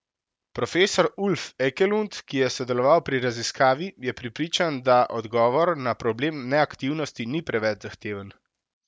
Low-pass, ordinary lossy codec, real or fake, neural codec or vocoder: none; none; real; none